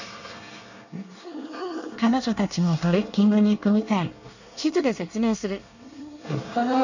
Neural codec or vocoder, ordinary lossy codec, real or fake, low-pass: codec, 24 kHz, 1 kbps, SNAC; none; fake; 7.2 kHz